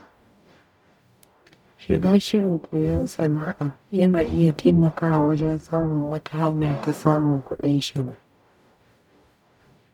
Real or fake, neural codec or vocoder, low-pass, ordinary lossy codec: fake; codec, 44.1 kHz, 0.9 kbps, DAC; 19.8 kHz; none